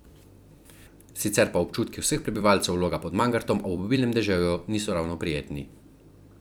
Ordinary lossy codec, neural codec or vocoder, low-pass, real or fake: none; vocoder, 44.1 kHz, 128 mel bands every 256 samples, BigVGAN v2; none; fake